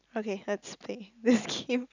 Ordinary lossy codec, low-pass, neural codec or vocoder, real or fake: none; 7.2 kHz; none; real